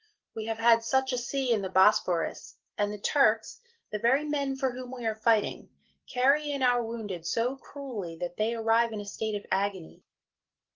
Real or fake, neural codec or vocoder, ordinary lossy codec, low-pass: real; none; Opus, 16 kbps; 7.2 kHz